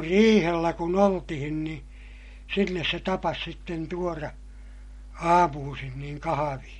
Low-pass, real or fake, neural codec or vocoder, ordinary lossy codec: 19.8 kHz; real; none; MP3, 48 kbps